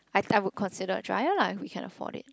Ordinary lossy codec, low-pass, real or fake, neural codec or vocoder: none; none; real; none